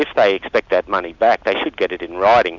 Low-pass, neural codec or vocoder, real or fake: 7.2 kHz; none; real